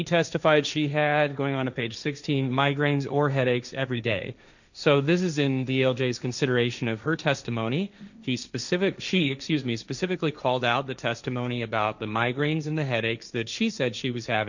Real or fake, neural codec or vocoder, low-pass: fake; codec, 16 kHz, 1.1 kbps, Voila-Tokenizer; 7.2 kHz